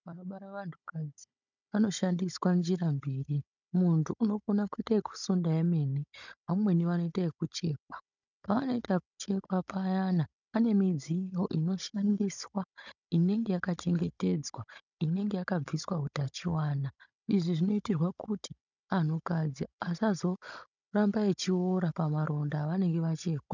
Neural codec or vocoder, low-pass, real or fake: codec, 16 kHz, 16 kbps, FunCodec, trained on Chinese and English, 50 frames a second; 7.2 kHz; fake